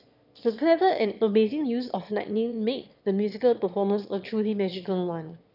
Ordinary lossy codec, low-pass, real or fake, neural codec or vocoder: none; 5.4 kHz; fake; autoencoder, 22.05 kHz, a latent of 192 numbers a frame, VITS, trained on one speaker